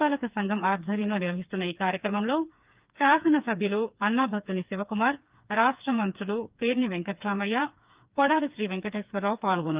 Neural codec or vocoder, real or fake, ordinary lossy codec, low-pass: codec, 16 kHz, 4 kbps, FreqCodec, smaller model; fake; Opus, 32 kbps; 3.6 kHz